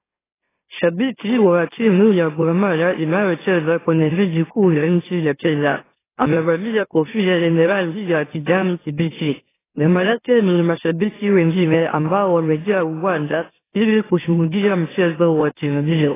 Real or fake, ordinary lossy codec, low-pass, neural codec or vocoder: fake; AAC, 16 kbps; 3.6 kHz; autoencoder, 44.1 kHz, a latent of 192 numbers a frame, MeloTTS